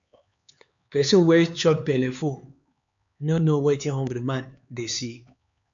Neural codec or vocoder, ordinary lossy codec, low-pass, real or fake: codec, 16 kHz, 4 kbps, X-Codec, HuBERT features, trained on LibriSpeech; MP3, 64 kbps; 7.2 kHz; fake